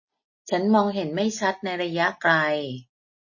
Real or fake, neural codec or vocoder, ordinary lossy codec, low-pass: real; none; MP3, 32 kbps; 7.2 kHz